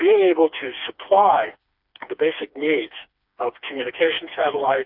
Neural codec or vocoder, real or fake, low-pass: codec, 16 kHz, 2 kbps, FreqCodec, smaller model; fake; 5.4 kHz